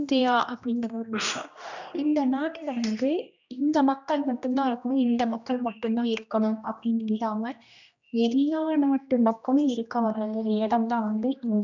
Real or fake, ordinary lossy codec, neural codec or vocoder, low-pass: fake; none; codec, 16 kHz, 1 kbps, X-Codec, HuBERT features, trained on general audio; 7.2 kHz